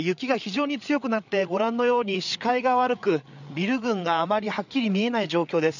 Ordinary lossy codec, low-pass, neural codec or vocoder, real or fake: none; 7.2 kHz; codec, 16 kHz, 8 kbps, FreqCodec, larger model; fake